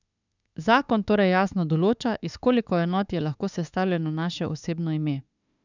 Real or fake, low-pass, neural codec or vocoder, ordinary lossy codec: fake; 7.2 kHz; autoencoder, 48 kHz, 32 numbers a frame, DAC-VAE, trained on Japanese speech; none